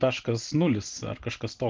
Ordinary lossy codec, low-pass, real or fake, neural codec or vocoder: Opus, 16 kbps; 7.2 kHz; real; none